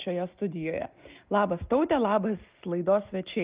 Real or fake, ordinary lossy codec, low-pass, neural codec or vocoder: real; Opus, 24 kbps; 3.6 kHz; none